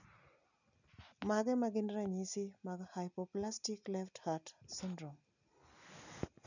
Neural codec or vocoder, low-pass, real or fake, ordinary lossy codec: none; 7.2 kHz; real; none